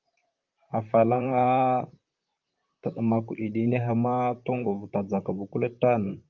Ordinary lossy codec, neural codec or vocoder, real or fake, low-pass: Opus, 24 kbps; vocoder, 44.1 kHz, 128 mel bands, Pupu-Vocoder; fake; 7.2 kHz